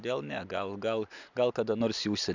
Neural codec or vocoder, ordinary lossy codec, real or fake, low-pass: none; Opus, 64 kbps; real; 7.2 kHz